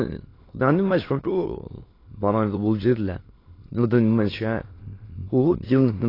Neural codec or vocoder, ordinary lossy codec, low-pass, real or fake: autoencoder, 22.05 kHz, a latent of 192 numbers a frame, VITS, trained on many speakers; AAC, 24 kbps; 5.4 kHz; fake